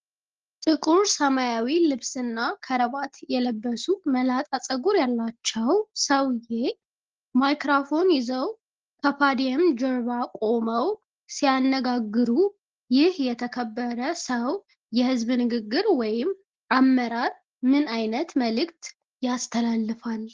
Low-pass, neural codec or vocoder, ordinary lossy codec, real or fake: 7.2 kHz; none; Opus, 16 kbps; real